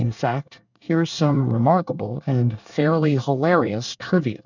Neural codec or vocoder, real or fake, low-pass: codec, 24 kHz, 1 kbps, SNAC; fake; 7.2 kHz